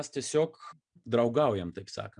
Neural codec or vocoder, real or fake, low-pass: none; real; 9.9 kHz